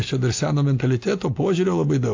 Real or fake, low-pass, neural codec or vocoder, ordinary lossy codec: real; 7.2 kHz; none; AAC, 48 kbps